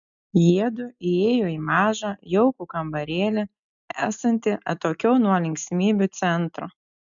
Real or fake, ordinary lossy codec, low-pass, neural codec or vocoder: real; MP3, 64 kbps; 7.2 kHz; none